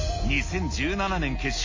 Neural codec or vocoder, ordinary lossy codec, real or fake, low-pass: none; MP3, 32 kbps; real; 7.2 kHz